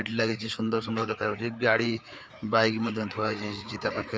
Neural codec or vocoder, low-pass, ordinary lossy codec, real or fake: codec, 16 kHz, 4 kbps, FreqCodec, larger model; none; none; fake